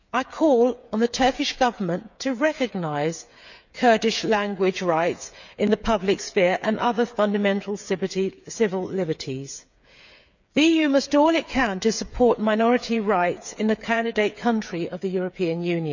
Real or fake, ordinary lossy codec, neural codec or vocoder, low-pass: fake; none; codec, 16 kHz, 8 kbps, FreqCodec, smaller model; 7.2 kHz